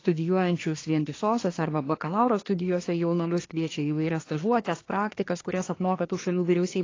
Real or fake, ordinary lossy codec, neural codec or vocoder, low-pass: fake; AAC, 32 kbps; codec, 24 kHz, 1 kbps, SNAC; 7.2 kHz